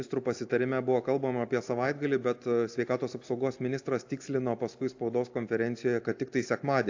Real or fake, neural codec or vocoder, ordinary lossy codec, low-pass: real; none; AAC, 48 kbps; 7.2 kHz